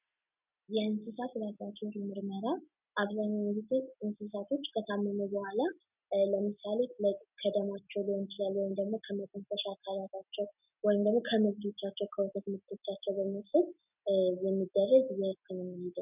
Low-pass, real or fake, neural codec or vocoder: 3.6 kHz; real; none